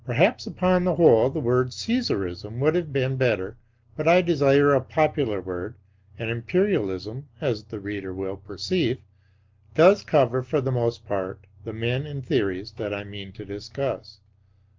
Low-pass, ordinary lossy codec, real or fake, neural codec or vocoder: 7.2 kHz; Opus, 24 kbps; real; none